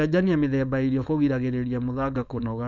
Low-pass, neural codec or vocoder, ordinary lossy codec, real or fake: 7.2 kHz; codec, 16 kHz, 4.8 kbps, FACodec; none; fake